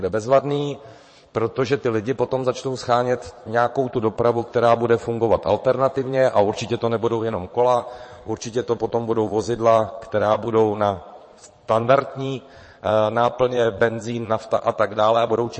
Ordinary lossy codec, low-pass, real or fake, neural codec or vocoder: MP3, 32 kbps; 9.9 kHz; fake; vocoder, 22.05 kHz, 80 mel bands, Vocos